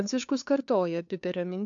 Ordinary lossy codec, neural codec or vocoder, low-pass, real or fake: AAC, 64 kbps; codec, 16 kHz, 2 kbps, FunCodec, trained on LibriTTS, 25 frames a second; 7.2 kHz; fake